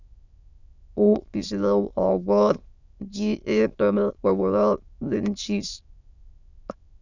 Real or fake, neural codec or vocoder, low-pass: fake; autoencoder, 22.05 kHz, a latent of 192 numbers a frame, VITS, trained on many speakers; 7.2 kHz